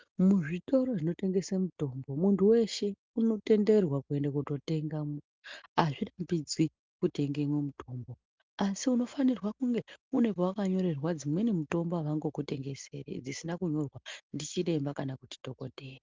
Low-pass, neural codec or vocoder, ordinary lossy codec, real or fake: 7.2 kHz; none; Opus, 24 kbps; real